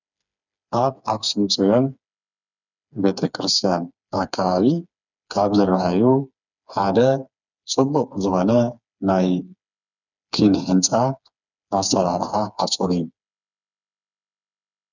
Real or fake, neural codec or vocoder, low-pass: fake; codec, 16 kHz, 4 kbps, FreqCodec, smaller model; 7.2 kHz